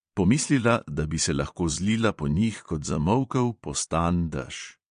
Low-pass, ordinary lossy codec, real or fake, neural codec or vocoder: 9.9 kHz; MP3, 48 kbps; fake; vocoder, 22.05 kHz, 80 mel bands, Vocos